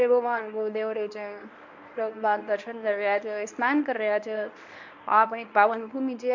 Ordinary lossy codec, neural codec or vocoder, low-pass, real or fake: MP3, 64 kbps; codec, 24 kHz, 0.9 kbps, WavTokenizer, medium speech release version 1; 7.2 kHz; fake